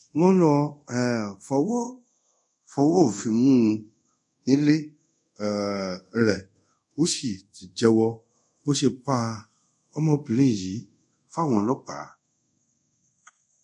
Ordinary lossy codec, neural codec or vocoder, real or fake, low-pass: none; codec, 24 kHz, 0.5 kbps, DualCodec; fake; 10.8 kHz